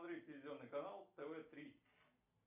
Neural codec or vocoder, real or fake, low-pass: none; real; 3.6 kHz